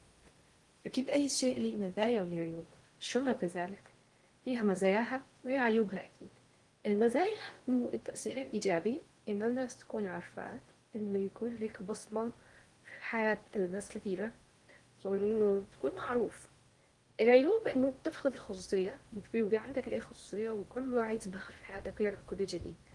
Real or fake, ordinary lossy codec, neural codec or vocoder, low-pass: fake; Opus, 24 kbps; codec, 16 kHz in and 24 kHz out, 0.6 kbps, FocalCodec, streaming, 2048 codes; 10.8 kHz